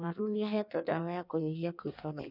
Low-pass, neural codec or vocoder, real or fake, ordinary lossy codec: 5.4 kHz; codec, 16 kHz in and 24 kHz out, 1.1 kbps, FireRedTTS-2 codec; fake; none